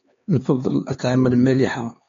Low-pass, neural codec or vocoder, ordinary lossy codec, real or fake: 7.2 kHz; codec, 16 kHz, 4 kbps, X-Codec, HuBERT features, trained on LibriSpeech; AAC, 32 kbps; fake